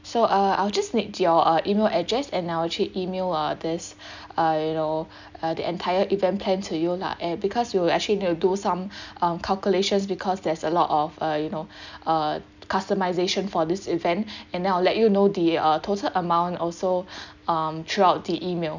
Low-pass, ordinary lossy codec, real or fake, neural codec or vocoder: 7.2 kHz; none; real; none